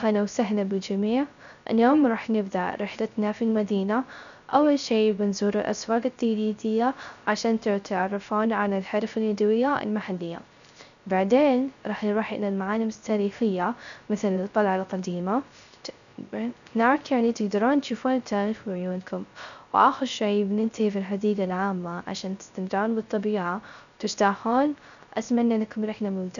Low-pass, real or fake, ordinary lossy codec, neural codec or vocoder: 7.2 kHz; fake; none; codec, 16 kHz, 0.3 kbps, FocalCodec